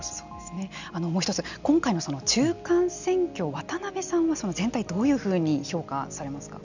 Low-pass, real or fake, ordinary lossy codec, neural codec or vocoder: 7.2 kHz; real; none; none